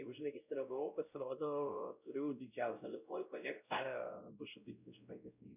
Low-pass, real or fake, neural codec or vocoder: 3.6 kHz; fake; codec, 16 kHz, 0.5 kbps, X-Codec, WavLM features, trained on Multilingual LibriSpeech